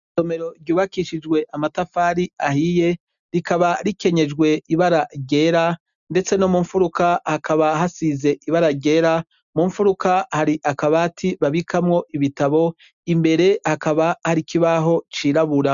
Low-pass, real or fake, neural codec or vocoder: 7.2 kHz; real; none